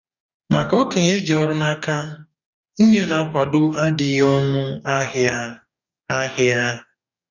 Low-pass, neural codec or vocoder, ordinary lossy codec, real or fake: 7.2 kHz; codec, 44.1 kHz, 2.6 kbps, DAC; none; fake